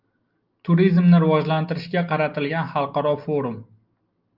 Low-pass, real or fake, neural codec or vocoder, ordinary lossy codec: 5.4 kHz; real; none; Opus, 32 kbps